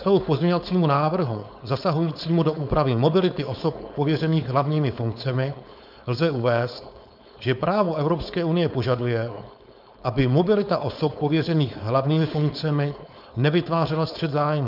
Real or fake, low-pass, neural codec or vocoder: fake; 5.4 kHz; codec, 16 kHz, 4.8 kbps, FACodec